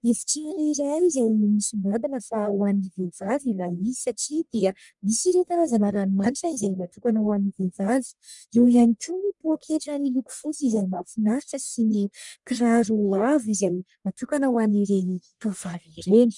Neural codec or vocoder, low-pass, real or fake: codec, 44.1 kHz, 1.7 kbps, Pupu-Codec; 10.8 kHz; fake